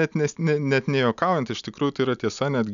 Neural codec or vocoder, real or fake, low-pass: none; real; 7.2 kHz